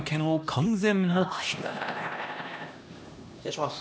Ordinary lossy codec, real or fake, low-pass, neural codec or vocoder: none; fake; none; codec, 16 kHz, 1 kbps, X-Codec, HuBERT features, trained on LibriSpeech